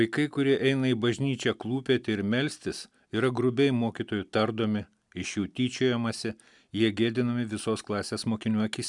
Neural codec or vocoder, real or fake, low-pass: none; real; 10.8 kHz